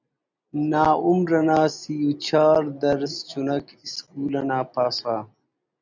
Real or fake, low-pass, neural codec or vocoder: real; 7.2 kHz; none